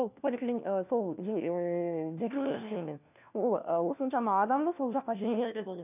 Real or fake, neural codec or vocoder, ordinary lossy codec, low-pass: fake; codec, 16 kHz, 1 kbps, FunCodec, trained on LibriTTS, 50 frames a second; none; 3.6 kHz